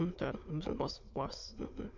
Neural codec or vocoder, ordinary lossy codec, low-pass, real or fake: autoencoder, 22.05 kHz, a latent of 192 numbers a frame, VITS, trained on many speakers; MP3, 64 kbps; 7.2 kHz; fake